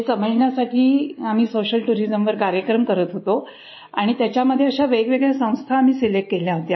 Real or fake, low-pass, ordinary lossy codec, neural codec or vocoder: real; 7.2 kHz; MP3, 24 kbps; none